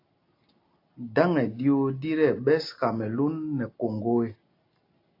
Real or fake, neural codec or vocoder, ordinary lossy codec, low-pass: real; none; AAC, 32 kbps; 5.4 kHz